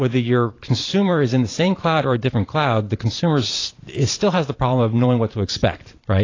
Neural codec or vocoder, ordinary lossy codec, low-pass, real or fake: vocoder, 44.1 kHz, 128 mel bands every 512 samples, BigVGAN v2; AAC, 32 kbps; 7.2 kHz; fake